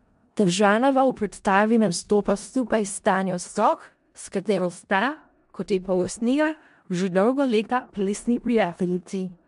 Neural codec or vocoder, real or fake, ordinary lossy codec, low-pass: codec, 16 kHz in and 24 kHz out, 0.4 kbps, LongCat-Audio-Codec, four codebook decoder; fake; MP3, 64 kbps; 10.8 kHz